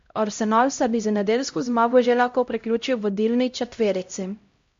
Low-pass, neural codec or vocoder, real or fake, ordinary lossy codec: 7.2 kHz; codec, 16 kHz, 0.5 kbps, X-Codec, HuBERT features, trained on LibriSpeech; fake; MP3, 48 kbps